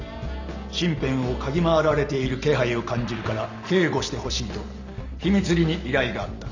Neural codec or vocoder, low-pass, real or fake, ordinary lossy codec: none; 7.2 kHz; real; none